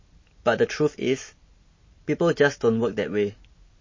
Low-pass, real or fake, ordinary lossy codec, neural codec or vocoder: 7.2 kHz; real; MP3, 32 kbps; none